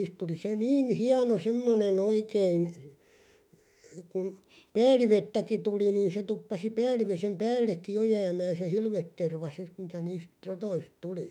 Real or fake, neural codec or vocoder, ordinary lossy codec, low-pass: fake; autoencoder, 48 kHz, 32 numbers a frame, DAC-VAE, trained on Japanese speech; none; 19.8 kHz